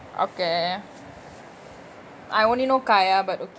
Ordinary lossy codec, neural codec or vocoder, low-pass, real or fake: none; none; none; real